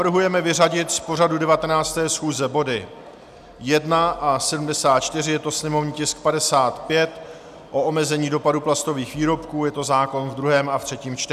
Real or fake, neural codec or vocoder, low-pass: real; none; 14.4 kHz